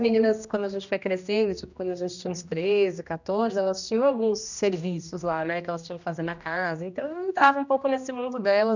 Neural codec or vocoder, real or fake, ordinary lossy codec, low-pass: codec, 16 kHz, 1 kbps, X-Codec, HuBERT features, trained on general audio; fake; none; 7.2 kHz